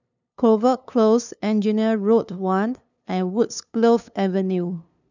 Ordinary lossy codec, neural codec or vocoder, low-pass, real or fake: none; codec, 16 kHz, 2 kbps, FunCodec, trained on LibriTTS, 25 frames a second; 7.2 kHz; fake